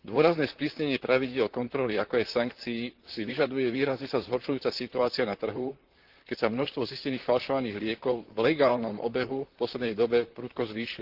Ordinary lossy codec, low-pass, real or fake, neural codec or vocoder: Opus, 16 kbps; 5.4 kHz; fake; vocoder, 44.1 kHz, 128 mel bands, Pupu-Vocoder